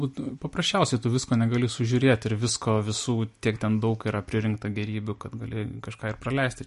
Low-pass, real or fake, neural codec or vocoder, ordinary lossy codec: 14.4 kHz; real; none; MP3, 48 kbps